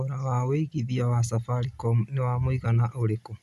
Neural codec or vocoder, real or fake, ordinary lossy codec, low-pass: none; real; none; 14.4 kHz